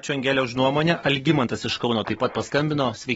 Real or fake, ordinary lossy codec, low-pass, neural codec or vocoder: real; AAC, 24 kbps; 19.8 kHz; none